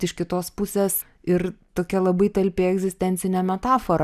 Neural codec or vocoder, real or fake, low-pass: none; real; 14.4 kHz